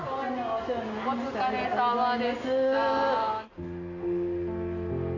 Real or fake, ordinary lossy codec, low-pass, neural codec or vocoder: real; AAC, 32 kbps; 7.2 kHz; none